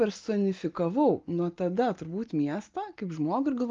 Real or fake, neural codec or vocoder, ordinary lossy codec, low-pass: real; none; Opus, 32 kbps; 7.2 kHz